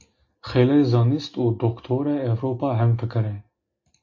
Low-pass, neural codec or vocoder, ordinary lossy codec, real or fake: 7.2 kHz; none; AAC, 48 kbps; real